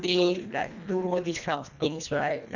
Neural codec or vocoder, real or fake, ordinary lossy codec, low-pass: codec, 24 kHz, 1.5 kbps, HILCodec; fake; none; 7.2 kHz